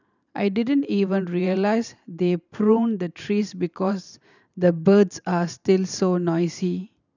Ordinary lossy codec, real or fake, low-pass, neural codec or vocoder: none; fake; 7.2 kHz; vocoder, 44.1 kHz, 128 mel bands every 512 samples, BigVGAN v2